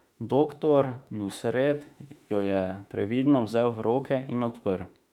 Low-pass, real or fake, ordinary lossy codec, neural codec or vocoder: 19.8 kHz; fake; none; autoencoder, 48 kHz, 32 numbers a frame, DAC-VAE, trained on Japanese speech